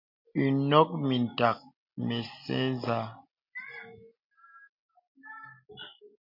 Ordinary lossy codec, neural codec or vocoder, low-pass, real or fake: MP3, 48 kbps; none; 5.4 kHz; real